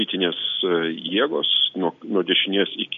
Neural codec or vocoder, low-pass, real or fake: none; 7.2 kHz; real